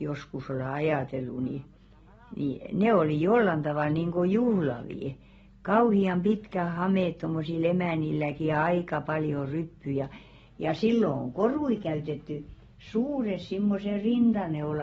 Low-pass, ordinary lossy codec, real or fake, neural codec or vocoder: 10.8 kHz; AAC, 24 kbps; real; none